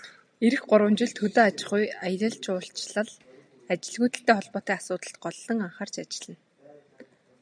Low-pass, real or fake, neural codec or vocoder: 9.9 kHz; real; none